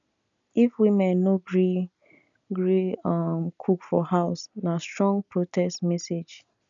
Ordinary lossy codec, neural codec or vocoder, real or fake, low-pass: none; none; real; 7.2 kHz